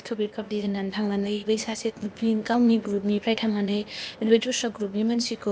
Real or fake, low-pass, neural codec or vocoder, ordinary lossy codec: fake; none; codec, 16 kHz, 0.8 kbps, ZipCodec; none